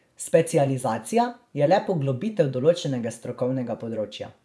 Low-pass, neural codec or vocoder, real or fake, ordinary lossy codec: none; vocoder, 24 kHz, 100 mel bands, Vocos; fake; none